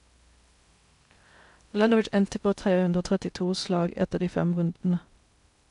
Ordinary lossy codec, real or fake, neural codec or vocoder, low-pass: none; fake; codec, 16 kHz in and 24 kHz out, 0.6 kbps, FocalCodec, streaming, 2048 codes; 10.8 kHz